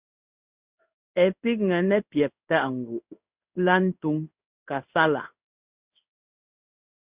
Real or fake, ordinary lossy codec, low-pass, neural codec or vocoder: fake; Opus, 64 kbps; 3.6 kHz; codec, 16 kHz in and 24 kHz out, 1 kbps, XY-Tokenizer